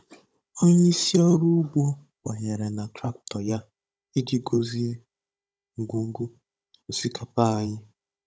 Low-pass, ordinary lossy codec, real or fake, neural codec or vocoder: none; none; fake; codec, 16 kHz, 16 kbps, FunCodec, trained on Chinese and English, 50 frames a second